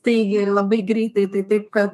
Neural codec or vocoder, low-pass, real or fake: codec, 32 kHz, 1.9 kbps, SNAC; 14.4 kHz; fake